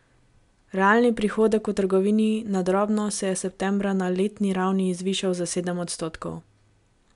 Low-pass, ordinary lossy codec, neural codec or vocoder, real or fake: 10.8 kHz; MP3, 96 kbps; none; real